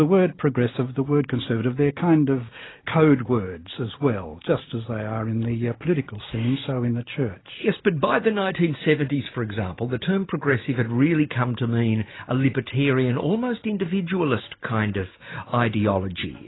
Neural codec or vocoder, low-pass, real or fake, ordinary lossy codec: none; 7.2 kHz; real; AAC, 16 kbps